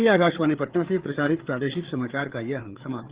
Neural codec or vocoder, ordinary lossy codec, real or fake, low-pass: codec, 16 kHz, 4 kbps, FreqCodec, larger model; Opus, 16 kbps; fake; 3.6 kHz